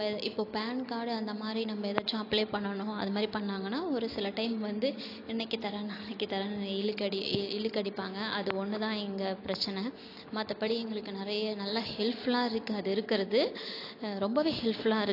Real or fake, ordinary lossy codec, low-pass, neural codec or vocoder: real; AAC, 32 kbps; 5.4 kHz; none